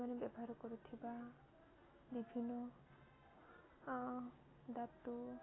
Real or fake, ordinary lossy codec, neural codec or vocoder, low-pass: real; AAC, 16 kbps; none; 7.2 kHz